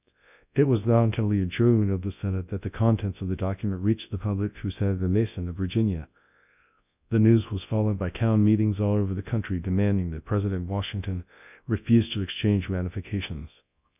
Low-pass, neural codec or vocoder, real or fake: 3.6 kHz; codec, 24 kHz, 0.9 kbps, WavTokenizer, large speech release; fake